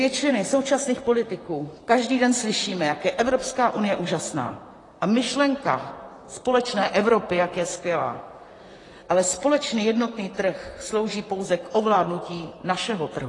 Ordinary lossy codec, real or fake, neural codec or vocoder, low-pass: AAC, 32 kbps; fake; vocoder, 44.1 kHz, 128 mel bands, Pupu-Vocoder; 10.8 kHz